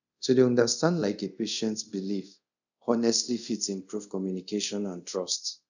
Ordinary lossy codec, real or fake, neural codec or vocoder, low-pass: none; fake; codec, 24 kHz, 0.5 kbps, DualCodec; 7.2 kHz